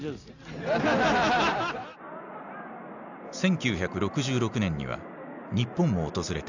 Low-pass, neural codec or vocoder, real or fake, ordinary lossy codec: 7.2 kHz; none; real; none